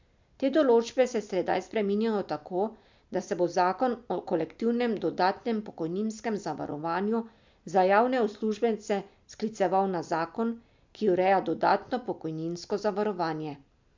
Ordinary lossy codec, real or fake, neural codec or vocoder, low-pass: MP3, 64 kbps; real; none; 7.2 kHz